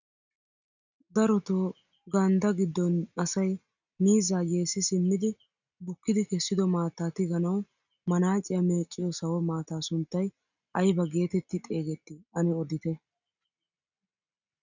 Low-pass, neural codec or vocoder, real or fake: 7.2 kHz; none; real